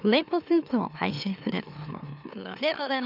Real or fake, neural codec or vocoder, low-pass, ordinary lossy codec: fake; autoencoder, 44.1 kHz, a latent of 192 numbers a frame, MeloTTS; 5.4 kHz; none